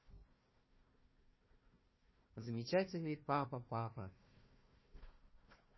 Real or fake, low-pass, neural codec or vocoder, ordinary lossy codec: fake; 7.2 kHz; codec, 16 kHz, 1 kbps, FunCodec, trained on Chinese and English, 50 frames a second; MP3, 24 kbps